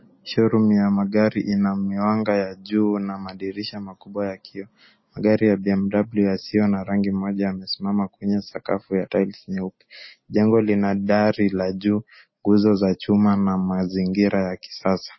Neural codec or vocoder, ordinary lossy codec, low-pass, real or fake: none; MP3, 24 kbps; 7.2 kHz; real